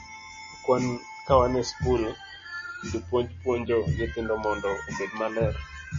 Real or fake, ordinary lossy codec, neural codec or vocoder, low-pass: real; MP3, 32 kbps; none; 7.2 kHz